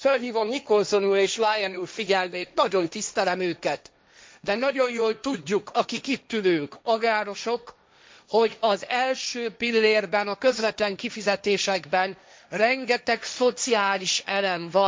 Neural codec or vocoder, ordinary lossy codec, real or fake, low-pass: codec, 16 kHz, 1.1 kbps, Voila-Tokenizer; none; fake; none